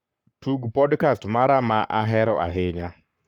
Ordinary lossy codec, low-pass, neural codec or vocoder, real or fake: none; 19.8 kHz; codec, 44.1 kHz, 7.8 kbps, Pupu-Codec; fake